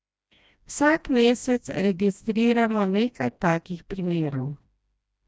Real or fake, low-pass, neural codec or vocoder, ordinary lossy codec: fake; none; codec, 16 kHz, 1 kbps, FreqCodec, smaller model; none